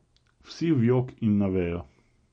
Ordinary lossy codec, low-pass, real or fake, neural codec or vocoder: MP3, 48 kbps; 9.9 kHz; real; none